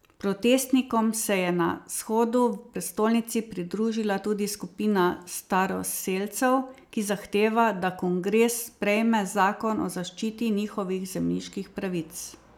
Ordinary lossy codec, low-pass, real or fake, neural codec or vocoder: none; none; real; none